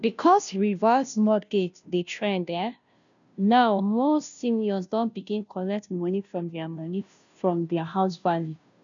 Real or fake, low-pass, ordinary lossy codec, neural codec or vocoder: fake; 7.2 kHz; none; codec, 16 kHz, 0.5 kbps, FunCodec, trained on Chinese and English, 25 frames a second